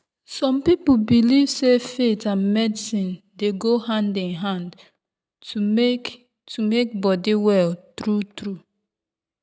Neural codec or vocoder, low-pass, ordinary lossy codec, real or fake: none; none; none; real